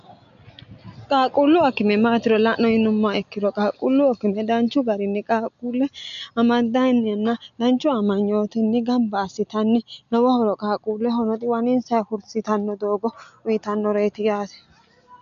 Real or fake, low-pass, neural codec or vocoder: real; 7.2 kHz; none